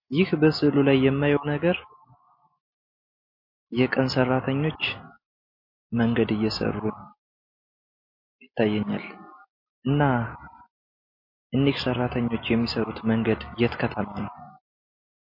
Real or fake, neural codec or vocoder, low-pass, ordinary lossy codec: real; none; 5.4 kHz; MP3, 32 kbps